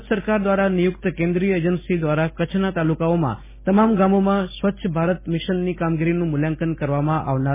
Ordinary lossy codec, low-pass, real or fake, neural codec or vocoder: MP3, 16 kbps; 3.6 kHz; real; none